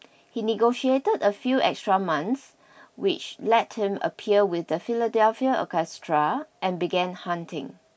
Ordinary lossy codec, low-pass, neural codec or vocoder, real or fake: none; none; none; real